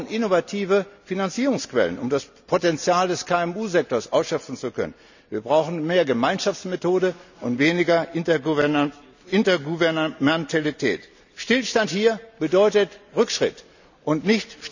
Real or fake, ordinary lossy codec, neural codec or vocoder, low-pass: real; none; none; 7.2 kHz